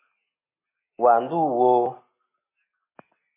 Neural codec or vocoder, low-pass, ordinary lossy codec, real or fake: none; 3.6 kHz; MP3, 16 kbps; real